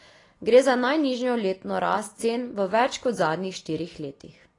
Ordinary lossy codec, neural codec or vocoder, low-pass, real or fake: AAC, 32 kbps; none; 10.8 kHz; real